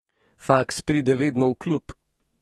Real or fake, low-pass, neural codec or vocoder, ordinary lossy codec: fake; 14.4 kHz; codec, 32 kHz, 1.9 kbps, SNAC; AAC, 32 kbps